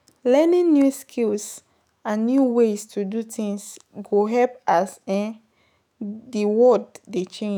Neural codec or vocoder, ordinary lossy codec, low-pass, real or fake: autoencoder, 48 kHz, 128 numbers a frame, DAC-VAE, trained on Japanese speech; none; none; fake